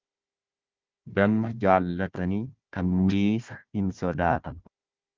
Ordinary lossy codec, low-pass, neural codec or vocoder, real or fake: Opus, 32 kbps; 7.2 kHz; codec, 16 kHz, 1 kbps, FunCodec, trained on Chinese and English, 50 frames a second; fake